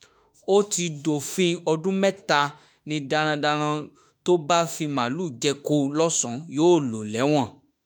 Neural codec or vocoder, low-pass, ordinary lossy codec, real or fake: autoencoder, 48 kHz, 32 numbers a frame, DAC-VAE, trained on Japanese speech; none; none; fake